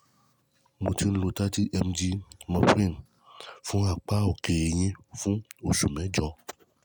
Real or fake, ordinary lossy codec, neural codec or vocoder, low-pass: real; none; none; none